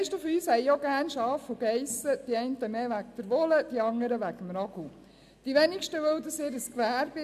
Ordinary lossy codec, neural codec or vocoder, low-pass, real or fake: AAC, 96 kbps; none; 14.4 kHz; real